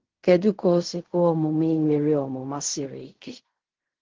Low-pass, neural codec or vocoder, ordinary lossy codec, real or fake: 7.2 kHz; codec, 16 kHz in and 24 kHz out, 0.4 kbps, LongCat-Audio-Codec, fine tuned four codebook decoder; Opus, 16 kbps; fake